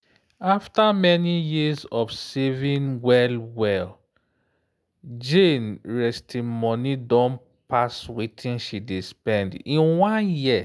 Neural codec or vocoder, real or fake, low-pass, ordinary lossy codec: none; real; none; none